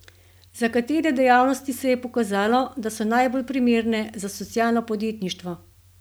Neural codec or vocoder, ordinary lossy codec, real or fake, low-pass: none; none; real; none